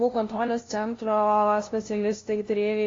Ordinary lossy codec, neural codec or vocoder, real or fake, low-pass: AAC, 32 kbps; codec, 16 kHz, 0.5 kbps, FunCodec, trained on LibriTTS, 25 frames a second; fake; 7.2 kHz